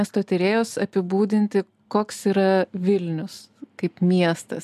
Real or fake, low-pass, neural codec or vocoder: real; 14.4 kHz; none